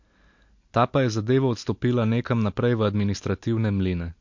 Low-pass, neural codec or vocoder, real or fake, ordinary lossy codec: 7.2 kHz; none; real; MP3, 48 kbps